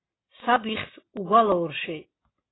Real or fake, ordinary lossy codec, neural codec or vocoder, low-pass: real; AAC, 16 kbps; none; 7.2 kHz